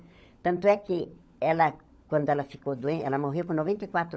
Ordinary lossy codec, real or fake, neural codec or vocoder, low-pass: none; fake; codec, 16 kHz, 16 kbps, FunCodec, trained on LibriTTS, 50 frames a second; none